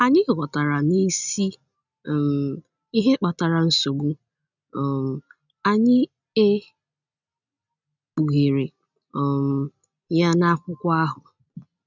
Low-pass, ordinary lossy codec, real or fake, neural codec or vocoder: 7.2 kHz; none; real; none